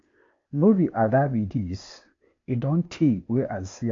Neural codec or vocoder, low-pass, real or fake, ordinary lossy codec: codec, 16 kHz, 0.8 kbps, ZipCodec; 7.2 kHz; fake; AAC, 48 kbps